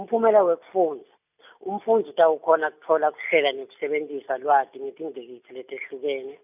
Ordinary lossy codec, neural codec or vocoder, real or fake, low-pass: none; none; real; 3.6 kHz